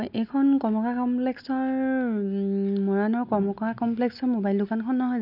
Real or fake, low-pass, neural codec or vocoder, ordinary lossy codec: real; 5.4 kHz; none; none